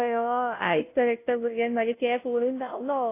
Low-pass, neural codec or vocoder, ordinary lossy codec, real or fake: 3.6 kHz; codec, 16 kHz, 0.5 kbps, FunCodec, trained on Chinese and English, 25 frames a second; AAC, 24 kbps; fake